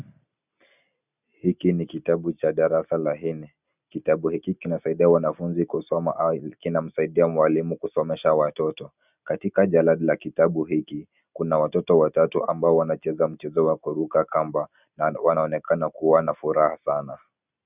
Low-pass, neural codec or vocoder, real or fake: 3.6 kHz; none; real